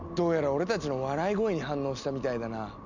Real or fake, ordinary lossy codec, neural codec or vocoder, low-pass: real; none; none; 7.2 kHz